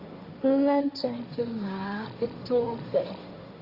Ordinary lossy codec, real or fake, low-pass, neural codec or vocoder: Opus, 32 kbps; fake; 5.4 kHz; codec, 16 kHz, 1.1 kbps, Voila-Tokenizer